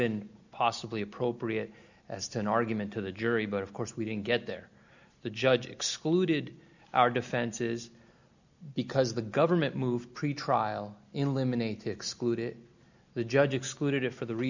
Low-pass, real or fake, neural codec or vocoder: 7.2 kHz; real; none